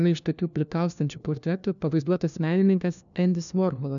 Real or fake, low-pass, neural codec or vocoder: fake; 7.2 kHz; codec, 16 kHz, 1 kbps, FunCodec, trained on LibriTTS, 50 frames a second